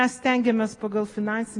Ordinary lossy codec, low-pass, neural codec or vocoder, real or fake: AAC, 32 kbps; 10.8 kHz; none; real